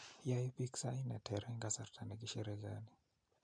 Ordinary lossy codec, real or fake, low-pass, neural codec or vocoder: MP3, 64 kbps; real; 9.9 kHz; none